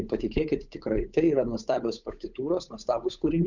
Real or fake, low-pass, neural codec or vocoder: fake; 7.2 kHz; codec, 16 kHz, 8 kbps, FunCodec, trained on Chinese and English, 25 frames a second